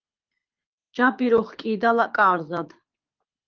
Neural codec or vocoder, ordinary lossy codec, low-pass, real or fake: codec, 24 kHz, 6 kbps, HILCodec; Opus, 24 kbps; 7.2 kHz; fake